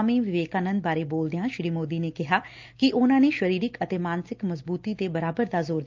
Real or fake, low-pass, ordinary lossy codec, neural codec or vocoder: real; 7.2 kHz; Opus, 24 kbps; none